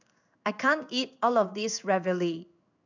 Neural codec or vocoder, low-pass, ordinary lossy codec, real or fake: codec, 16 kHz in and 24 kHz out, 1 kbps, XY-Tokenizer; 7.2 kHz; none; fake